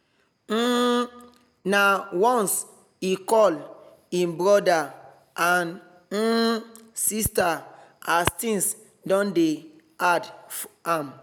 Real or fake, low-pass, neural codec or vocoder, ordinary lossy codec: real; none; none; none